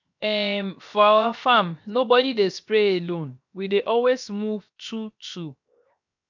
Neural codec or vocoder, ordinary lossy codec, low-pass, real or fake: codec, 16 kHz, 0.7 kbps, FocalCodec; none; 7.2 kHz; fake